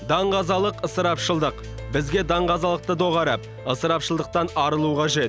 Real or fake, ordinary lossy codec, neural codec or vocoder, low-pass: real; none; none; none